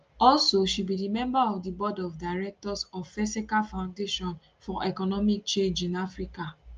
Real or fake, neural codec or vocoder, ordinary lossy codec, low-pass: real; none; Opus, 24 kbps; 7.2 kHz